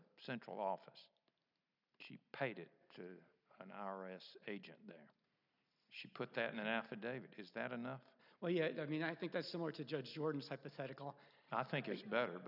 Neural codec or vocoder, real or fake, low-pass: none; real; 5.4 kHz